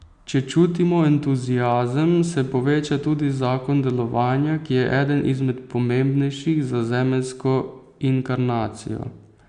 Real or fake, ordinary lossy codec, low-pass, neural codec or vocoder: real; Opus, 64 kbps; 9.9 kHz; none